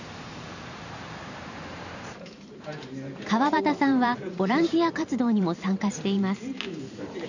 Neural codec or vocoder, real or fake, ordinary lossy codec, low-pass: vocoder, 44.1 kHz, 128 mel bands every 512 samples, BigVGAN v2; fake; none; 7.2 kHz